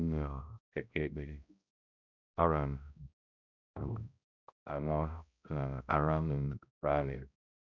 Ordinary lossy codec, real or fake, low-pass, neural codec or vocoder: none; fake; 7.2 kHz; codec, 16 kHz, 0.5 kbps, X-Codec, HuBERT features, trained on balanced general audio